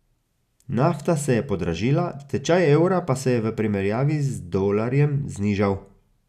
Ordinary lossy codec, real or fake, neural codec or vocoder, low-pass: none; real; none; 14.4 kHz